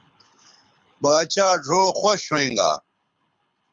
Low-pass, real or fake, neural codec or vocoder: 9.9 kHz; fake; codec, 24 kHz, 6 kbps, HILCodec